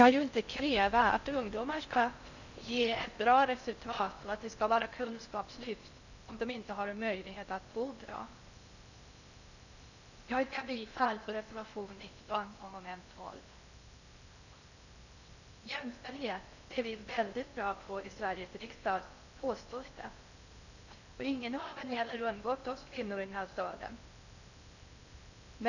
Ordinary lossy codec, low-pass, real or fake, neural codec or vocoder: none; 7.2 kHz; fake; codec, 16 kHz in and 24 kHz out, 0.6 kbps, FocalCodec, streaming, 4096 codes